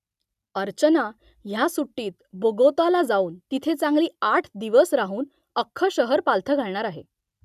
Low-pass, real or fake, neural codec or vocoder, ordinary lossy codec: 14.4 kHz; real; none; none